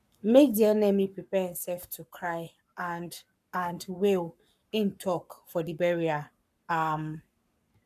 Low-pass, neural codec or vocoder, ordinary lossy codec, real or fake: 14.4 kHz; codec, 44.1 kHz, 7.8 kbps, Pupu-Codec; none; fake